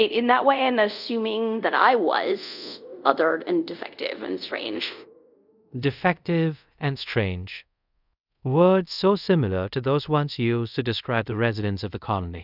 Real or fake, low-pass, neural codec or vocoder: fake; 5.4 kHz; codec, 24 kHz, 0.5 kbps, DualCodec